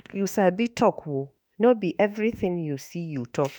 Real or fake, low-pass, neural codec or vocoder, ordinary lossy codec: fake; none; autoencoder, 48 kHz, 32 numbers a frame, DAC-VAE, trained on Japanese speech; none